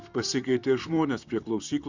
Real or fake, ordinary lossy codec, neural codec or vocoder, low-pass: fake; Opus, 64 kbps; vocoder, 44.1 kHz, 128 mel bands, Pupu-Vocoder; 7.2 kHz